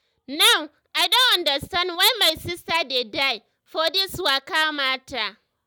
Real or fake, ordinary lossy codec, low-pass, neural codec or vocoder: real; none; none; none